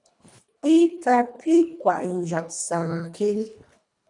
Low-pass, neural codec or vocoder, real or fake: 10.8 kHz; codec, 24 kHz, 1.5 kbps, HILCodec; fake